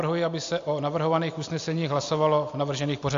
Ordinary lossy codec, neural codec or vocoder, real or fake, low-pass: AAC, 64 kbps; none; real; 7.2 kHz